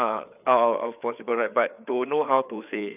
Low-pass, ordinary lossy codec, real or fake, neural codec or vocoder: 3.6 kHz; none; fake; codec, 16 kHz, 4 kbps, FreqCodec, larger model